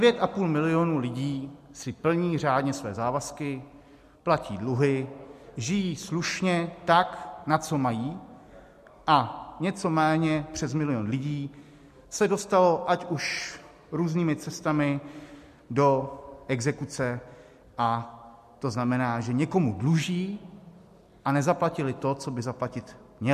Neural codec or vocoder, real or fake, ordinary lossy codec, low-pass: none; real; MP3, 64 kbps; 14.4 kHz